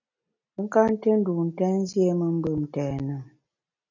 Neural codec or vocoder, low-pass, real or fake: none; 7.2 kHz; real